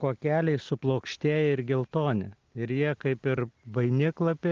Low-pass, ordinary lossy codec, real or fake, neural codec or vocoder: 7.2 kHz; Opus, 24 kbps; real; none